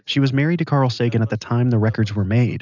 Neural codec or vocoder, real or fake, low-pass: none; real; 7.2 kHz